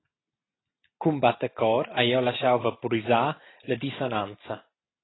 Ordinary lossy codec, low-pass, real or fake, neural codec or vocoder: AAC, 16 kbps; 7.2 kHz; real; none